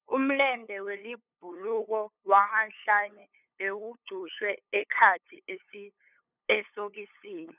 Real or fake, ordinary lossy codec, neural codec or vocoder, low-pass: fake; none; codec, 16 kHz, 8 kbps, FunCodec, trained on LibriTTS, 25 frames a second; 3.6 kHz